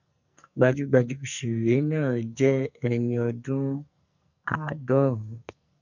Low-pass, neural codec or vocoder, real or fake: 7.2 kHz; codec, 44.1 kHz, 2.6 kbps, SNAC; fake